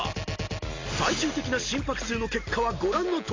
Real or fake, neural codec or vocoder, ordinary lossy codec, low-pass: real; none; AAC, 32 kbps; 7.2 kHz